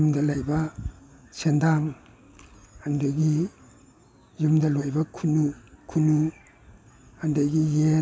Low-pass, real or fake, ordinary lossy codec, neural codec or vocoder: none; real; none; none